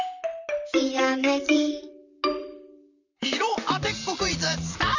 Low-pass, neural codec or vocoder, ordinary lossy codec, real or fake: 7.2 kHz; vocoder, 44.1 kHz, 128 mel bands, Pupu-Vocoder; none; fake